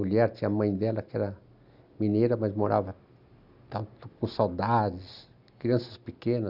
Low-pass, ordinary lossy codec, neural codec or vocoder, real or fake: 5.4 kHz; Opus, 64 kbps; none; real